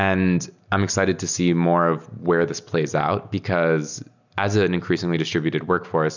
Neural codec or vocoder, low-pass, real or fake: none; 7.2 kHz; real